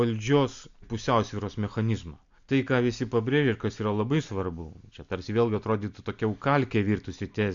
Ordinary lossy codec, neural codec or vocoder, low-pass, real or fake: MP3, 64 kbps; none; 7.2 kHz; real